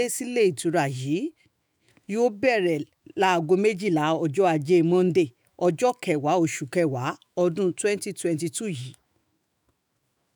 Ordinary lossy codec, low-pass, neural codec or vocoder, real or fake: none; none; autoencoder, 48 kHz, 128 numbers a frame, DAC-VAE, trained on Japanese speech; fake